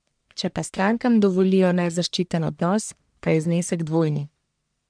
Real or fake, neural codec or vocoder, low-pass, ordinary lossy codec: fake; codec, 44.1 kHz, 1.7 kbps, Pupu-Codec; 9.9 kHz; MP3, 96 kbps